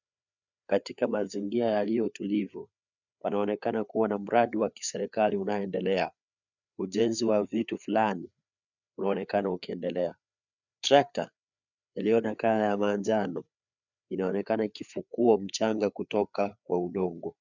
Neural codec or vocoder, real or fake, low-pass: codec, 16 kHz, 4 kbps, FreqCodec, larger model; fake; 7.2 kHz